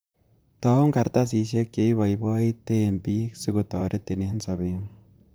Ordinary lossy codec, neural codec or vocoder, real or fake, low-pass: none; vocoder, 44.1 kHz, 128 mel bands, Pupu-Vocoder; fake; none